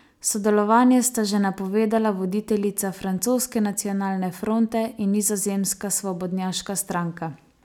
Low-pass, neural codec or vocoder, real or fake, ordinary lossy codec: 19.8 kHz; none; real; none